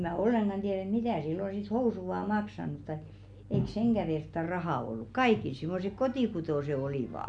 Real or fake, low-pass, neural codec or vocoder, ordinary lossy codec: real; 9.9 kHz; none; none